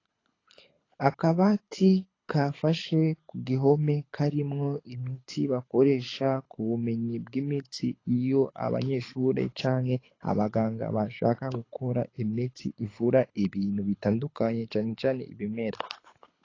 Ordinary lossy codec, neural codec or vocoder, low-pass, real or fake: AAC, 32 kbps; codec, 24 kHz, 6 kbps, HILCodec; 7.2 kHz; fake